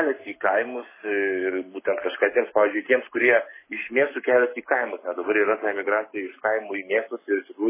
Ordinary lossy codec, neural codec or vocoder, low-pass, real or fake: MP3, 16 kbps; codec, 44.1 kHz, 7.8 kbps, Pupu-Codec; 3.6 kHz; fake